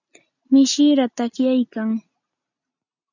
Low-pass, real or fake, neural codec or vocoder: 7.2 kHz; real; none